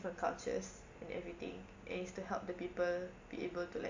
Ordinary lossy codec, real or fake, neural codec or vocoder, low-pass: MP3, 48 kbps; real; none; 7.2 kHz